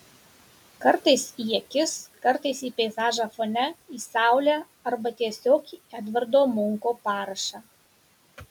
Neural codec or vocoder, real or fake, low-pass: none; real; 19.8 kHz